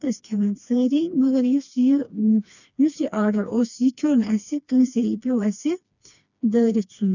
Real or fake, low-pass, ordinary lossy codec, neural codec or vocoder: fake; 7.2 kHz; none; codec, 16 kHz, 2 kbps, FreqCodec, smaller model